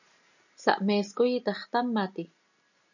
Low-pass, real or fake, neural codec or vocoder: 7.2 kHz; real; none